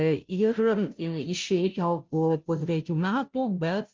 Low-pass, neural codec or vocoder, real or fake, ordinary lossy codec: 7.2 kHz; codec, 16 kHz, 0.5 kbps, FunCodec, trained on Chinese and English, 25 frames a second; fake; Opus, 24 kbps